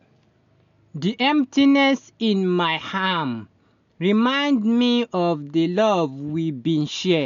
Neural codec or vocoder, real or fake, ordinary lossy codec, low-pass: none; real; none; 7.2 kHz